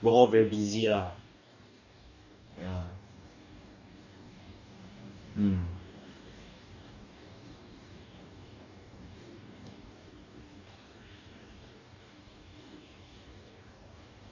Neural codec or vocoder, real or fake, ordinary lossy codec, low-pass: codec, 44.1 kHz, 2.6 kbps, DAC; fake; none; 7.2 kHz